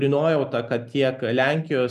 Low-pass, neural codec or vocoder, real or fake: 14.4 kHz; none; real